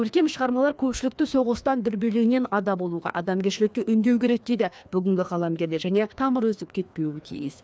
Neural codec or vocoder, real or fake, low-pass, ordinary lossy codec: codec, 16 kHz, 2 kbps, FreqCodec, larger model; fake; none; none